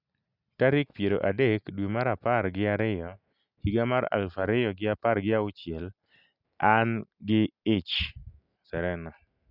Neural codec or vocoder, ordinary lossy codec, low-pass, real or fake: none; none; 5.4 kHz; real